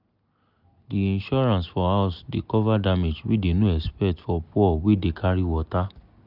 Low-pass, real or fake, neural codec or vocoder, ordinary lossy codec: 5.4 kHz; real; none; none